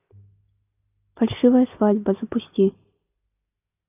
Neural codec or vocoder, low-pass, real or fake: none; 3.6 kHz; real